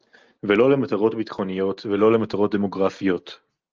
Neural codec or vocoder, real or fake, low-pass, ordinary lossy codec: none; real; 7.2 kHz; Opus, 32 kbps